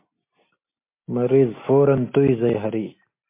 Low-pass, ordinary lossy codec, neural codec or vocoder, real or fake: 3.6 kHz; MP3, 24 kbps; none; real